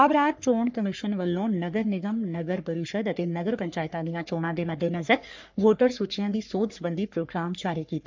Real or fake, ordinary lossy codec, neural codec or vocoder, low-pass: fake; MP3, 64 kbps; codec, 44.1 kHz, 3.4 kbps, Pupu-Codec; 7.2 kHz